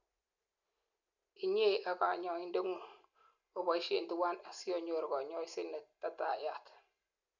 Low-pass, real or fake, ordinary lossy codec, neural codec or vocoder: 7.2 kHz; real; none; none